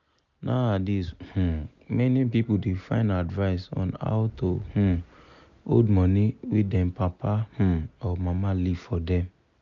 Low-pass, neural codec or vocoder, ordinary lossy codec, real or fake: 7.2 kHz; none; none; real